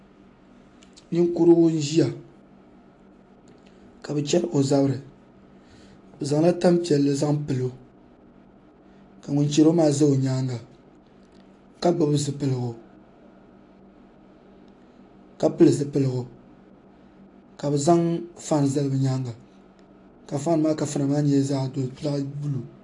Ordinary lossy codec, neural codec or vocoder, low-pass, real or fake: AAC, 48 kbps; none; 10.8 kHz; real